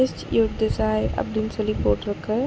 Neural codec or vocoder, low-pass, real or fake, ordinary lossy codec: none; none; real; none